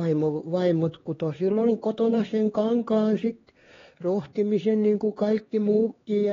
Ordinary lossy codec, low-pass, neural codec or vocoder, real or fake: AAC, 24 kbps; 7.2 kHz; codec, 16 kHz, 2 kbps, X-Codec, HuBERT features, trained on LibriSpeech; fake